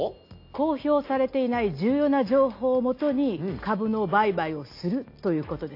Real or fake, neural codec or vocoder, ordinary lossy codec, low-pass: real; none; AAC, 32 kbps; 5.4 kHz